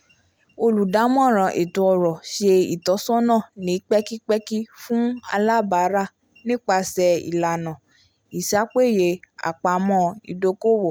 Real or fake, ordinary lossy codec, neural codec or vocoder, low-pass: real; none; none; none